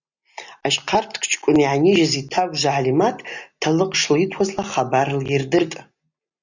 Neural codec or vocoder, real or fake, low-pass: none; real; 7.2 kHz